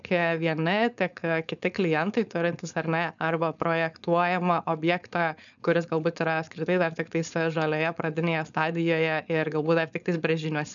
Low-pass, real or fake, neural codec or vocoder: 7.2 kHz; fake; codec, 16 kHz, 4.8 kbps, FACodec